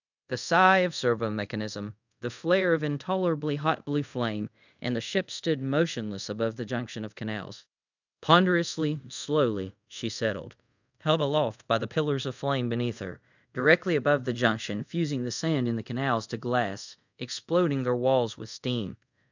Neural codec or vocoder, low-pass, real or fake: codec, 24 kHz, 0.5 kbps, DualCodec; 7.2 kHz; fake